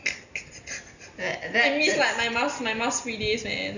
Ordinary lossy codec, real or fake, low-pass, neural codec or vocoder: none; real; 7.2 kHz; none